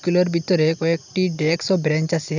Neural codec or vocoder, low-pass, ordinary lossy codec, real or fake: none; 7.2 kHz; none; real